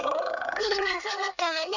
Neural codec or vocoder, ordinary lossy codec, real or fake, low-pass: codec, 24 kHz, 1 kbps, SNAC; none; fake; 7.2 kHz